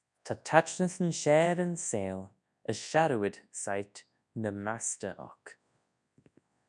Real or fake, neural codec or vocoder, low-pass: fake; codec, 24 kHz, 0.9 kbps, WavTokenizer, large speech release; 10.8 kHz